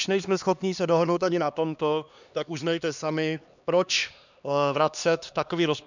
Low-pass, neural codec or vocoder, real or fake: 7.2 kHz; codec, 16 kHz, 2 kbps, X-Codec, HuBERT features, trained on LibriSpeech; fake